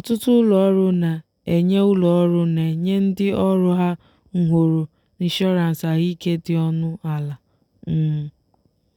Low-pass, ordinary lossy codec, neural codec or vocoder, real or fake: 19.8 kHz; none; none; real